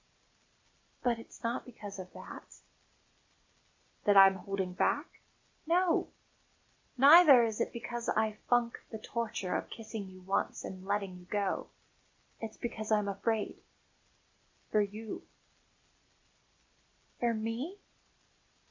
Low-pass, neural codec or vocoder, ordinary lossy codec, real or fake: 7.2 kHz; none; MP3, 48 kbps; real